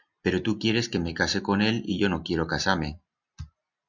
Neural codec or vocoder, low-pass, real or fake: none; 7.2 kHz; real